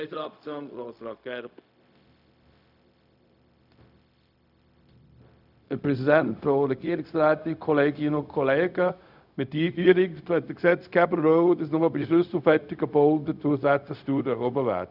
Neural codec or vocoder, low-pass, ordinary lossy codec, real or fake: codec, 16 kHz, 0.4 kbps, LongCat-Audio-Codec; 5.4 kHz; none; fake